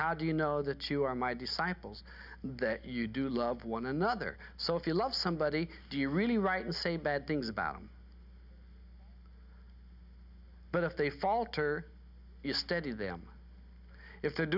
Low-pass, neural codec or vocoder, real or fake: 5.4 kHz; none; real